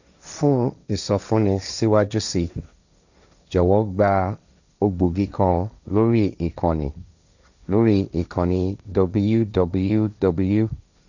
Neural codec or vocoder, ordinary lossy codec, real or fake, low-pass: codec, 16 kHz, 1.1 kbps, Voila-Tokenizer; none; fake; 7.2 kHz